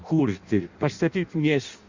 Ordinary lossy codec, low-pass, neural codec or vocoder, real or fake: Opus, 64 kbps; 7.2 kHz; codec, 16 kHz in and 24 kHz out, 0.6 kbps, FireRedTTS-2 codec; fake